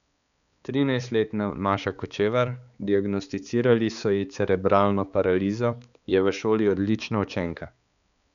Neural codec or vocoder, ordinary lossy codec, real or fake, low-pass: codec, 16 kHz, 4 kbps, X-Codec, HuBERT features, trained on balanced general audio; none; fake; 7.2 kHz